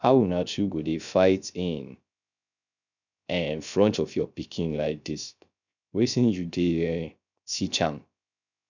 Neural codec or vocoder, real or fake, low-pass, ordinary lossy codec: codec, 16 kHz, 0.3 kbps, FocalCodec; fake; 7.2 kHz; none